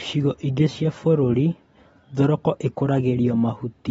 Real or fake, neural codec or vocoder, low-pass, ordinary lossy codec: real; none; 19.8 kHz; AAC, 24 kbps